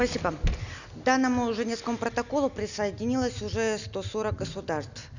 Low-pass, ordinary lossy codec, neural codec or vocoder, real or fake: 7.2 kHz; none; none; real